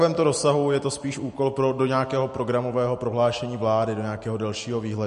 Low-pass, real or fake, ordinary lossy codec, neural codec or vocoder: 14.4 kHz; real; MP3, 48 kbps; none